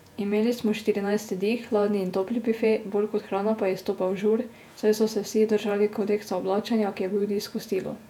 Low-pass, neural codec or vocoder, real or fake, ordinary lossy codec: 19.8 kHz; vocoder, 48 kHz, 128 mel bands, Vocos; fake; none